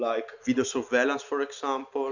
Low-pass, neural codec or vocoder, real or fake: 7.2 kHz; none; real